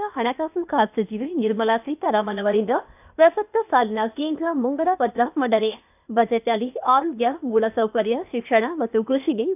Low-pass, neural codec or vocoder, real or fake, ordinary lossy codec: 3.6 kHz; codec, 16 kHz, 0.8 kbps, ZipCodec; fake; none